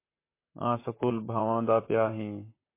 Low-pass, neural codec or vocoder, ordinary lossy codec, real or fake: 3.6 kHz; vocoder, 44.1 kHz, 128 mel bands, Pupu-Vocoder; MP3, 24 kbps; fake